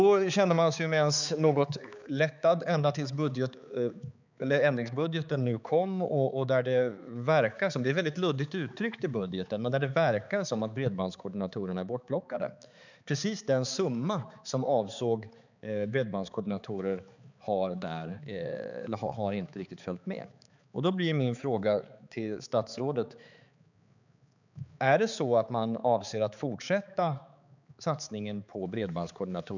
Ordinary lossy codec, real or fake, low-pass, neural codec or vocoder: none; fake; 7.2 kHz; codec, 16 kHz, 4 kbps, X-Codec, HuBERT features, trained on balanced general audio